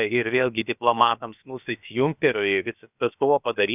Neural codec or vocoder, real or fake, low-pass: codec, 16 kHz, about 1 kbps, DyCAST, with the encoder's durations; fake; 3.6 kHz